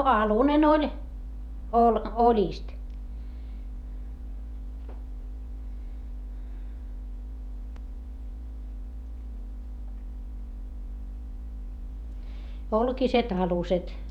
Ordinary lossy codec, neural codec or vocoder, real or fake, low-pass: none; vocoder, 48 kHz, 128 mel bands, Vocos; fake; 19.8 kHz